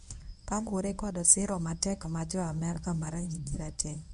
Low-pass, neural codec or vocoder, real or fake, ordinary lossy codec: 10.8 kHz; codec, 24 kHz, 0.9 kbps, WavTokenizer, medium speech release version 1; fake; none